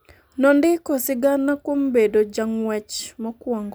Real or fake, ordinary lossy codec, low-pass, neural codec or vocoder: real; none; none; none